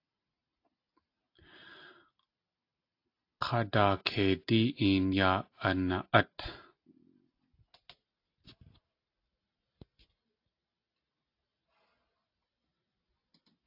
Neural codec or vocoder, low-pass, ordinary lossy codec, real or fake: none; 5.4 kHz; AAC, 32 kbps; real